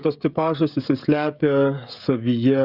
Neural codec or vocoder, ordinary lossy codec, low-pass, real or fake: codec, 16 kHz, 16 kbps, FreqCodec, smaller model; Opus, 64 kbps; 5.4 kHz; fake